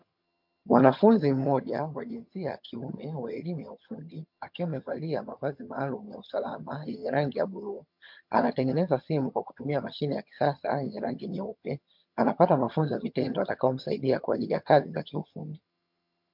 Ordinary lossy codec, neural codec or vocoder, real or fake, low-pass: MP3, 48 kbps; vocoder, 22.05 kHz, 80 mel bands, HiFi-GAN; fake; 5.4 kHz